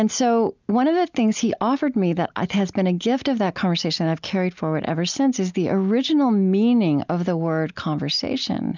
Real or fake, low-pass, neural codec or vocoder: real; 7.2 kHz; none